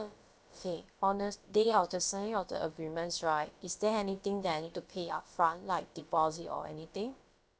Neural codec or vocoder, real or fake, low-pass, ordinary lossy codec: codec, 16 kHz, about 1 kbps, DyCAST, with the encoder's durations; fake; none; none